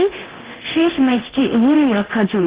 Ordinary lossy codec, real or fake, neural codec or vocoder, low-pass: Opus, 16 kbps; fake; codec, 24 kHz, 0.9 kbps, DualCodec; 3.6 kHz